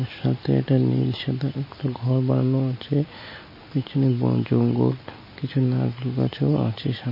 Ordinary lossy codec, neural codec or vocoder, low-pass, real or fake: MP3, 24 kbps; none; 5.4 kHz; real